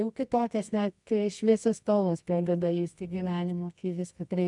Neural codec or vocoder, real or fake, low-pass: codec, 24 kHz, 0.9 kbps, WavTokenizer, medium music audio release; fake; 10.8 kHz